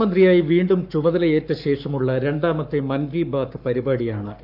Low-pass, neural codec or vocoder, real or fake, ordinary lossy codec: 5.4 kHz; codec, 44.1 kHz, 7.8 kbps, Pupu-Codec; fake; none